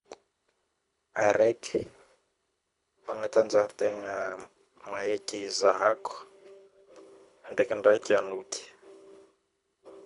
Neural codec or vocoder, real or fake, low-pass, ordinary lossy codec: codec, 24 kHz, 3 kbps, HILCodec; fake; 10.8 kHz; none